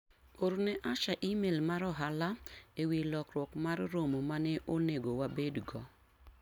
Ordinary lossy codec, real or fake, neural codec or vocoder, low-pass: none; real; none; 19.8 kHz